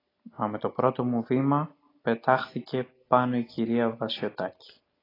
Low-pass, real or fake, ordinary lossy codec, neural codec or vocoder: 5.4 kHz; real; AAC, 24 kbps; none